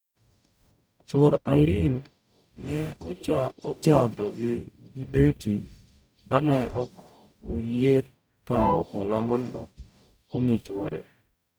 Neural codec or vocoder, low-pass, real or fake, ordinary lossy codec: codec, 44.1 kHz, 0.9 kbps, DAC; none; fake; none